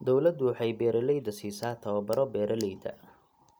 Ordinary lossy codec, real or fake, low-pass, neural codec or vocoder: none; real; none; none